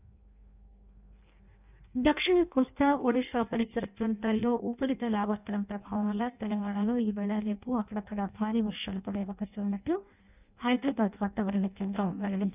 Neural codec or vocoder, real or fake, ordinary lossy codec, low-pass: codec, 16 kHz in and 24 kHz out, 0.6 kbps, FireRedTTS-2 codec; fake; none; 3.6 kHz